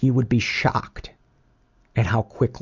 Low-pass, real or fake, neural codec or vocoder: 7.2 kHz; real; none